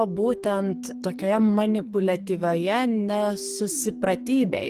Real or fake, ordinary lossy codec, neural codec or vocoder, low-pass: fake; Opus, 24 kbps; codec, 44.1 kHz, 2.6 kbps, SNAC; 14.4 kHz